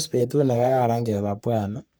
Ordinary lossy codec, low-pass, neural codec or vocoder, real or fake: none; none; codec, 44.1 kHz, 3.4 kbps, Pupu-Codec; fake